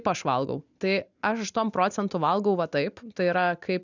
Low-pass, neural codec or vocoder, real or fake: 7.2 kHz; none; real